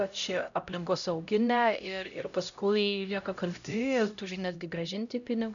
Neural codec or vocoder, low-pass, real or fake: codec, 16 kHz, 0.5 kbps, X-Codec, HuBERT features, trained on LibriSpeech; 7.2 kHz; fake